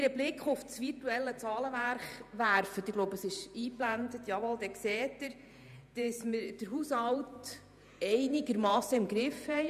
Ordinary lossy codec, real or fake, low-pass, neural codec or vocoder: none; fake; 14.4 kHz; vocoder, 48 kHz, 128 mel bands, Vocos